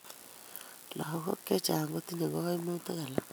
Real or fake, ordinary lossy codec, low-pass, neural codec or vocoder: real; none; none; none